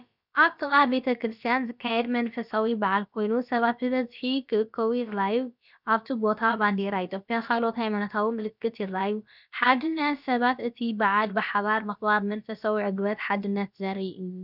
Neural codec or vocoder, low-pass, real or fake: codec, 16 kHz, about 1 kbps, DyCAST, with the encoder's durations; 5.4 kHz; fake